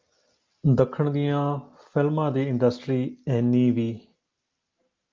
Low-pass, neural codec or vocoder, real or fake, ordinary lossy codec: 7.2 kHz; none; real; Opus, 32 kbps